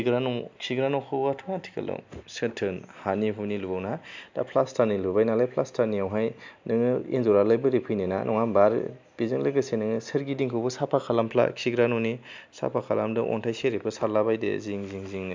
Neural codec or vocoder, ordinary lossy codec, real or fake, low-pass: none; MP3, 64 kbps; real; 7.2 kHz